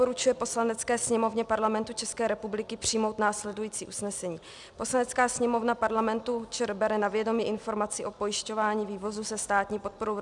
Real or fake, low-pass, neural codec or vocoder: real; 10.8 kHz; none